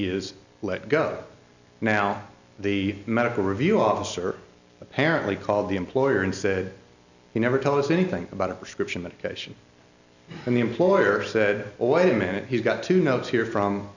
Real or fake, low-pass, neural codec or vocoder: real; 7.2 kHz; none